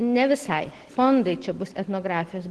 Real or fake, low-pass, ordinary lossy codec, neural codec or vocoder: real; 10.8 kHz; Opus, 16 kbps; none